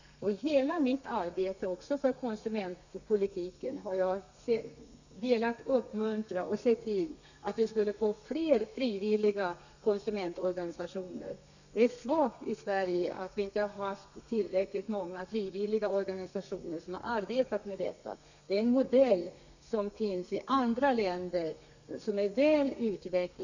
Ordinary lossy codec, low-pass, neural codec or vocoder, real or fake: none; 7.2 kHz; codec, 32 kHz, 1.9 kbps, SNAC; fake